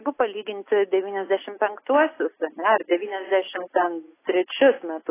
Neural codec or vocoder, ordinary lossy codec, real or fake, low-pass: none; AAC, 16 kbps; real; 3.6 kHz